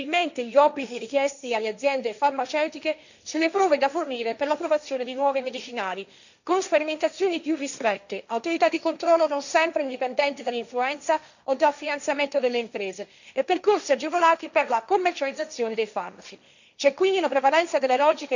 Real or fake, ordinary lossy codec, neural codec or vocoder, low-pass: fake; none; codec, 16 kHz, 1.1 kbps, Voila-Tokenizer; 7.2 kHz